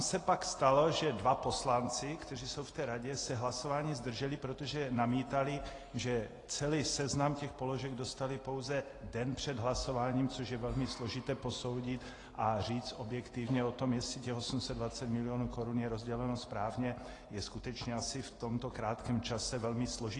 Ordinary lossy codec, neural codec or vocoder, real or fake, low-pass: AAC, 32 kbps; none; real; 10.8 kHz